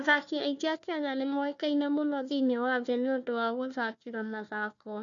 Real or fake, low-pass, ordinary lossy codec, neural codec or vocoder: fake; 7.2 kHz; MP3, 96 kbps; codec, 16 kHz, 1 kbps, FunCodec, trained on Chinese and English, 50 frames a second